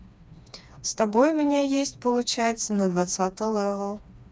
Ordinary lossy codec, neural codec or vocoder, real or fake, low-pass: none; codec, 16 kHz, 2 kbps, FreqCodec, smaller model; fake; none